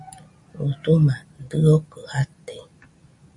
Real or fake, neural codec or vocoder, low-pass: real; none; 10.8 kHz